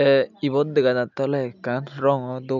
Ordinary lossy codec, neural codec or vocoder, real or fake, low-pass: none; none; real; 7.2 kHz